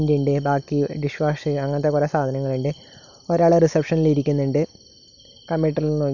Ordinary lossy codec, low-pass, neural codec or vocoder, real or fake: none; 7.2 kHz; none; real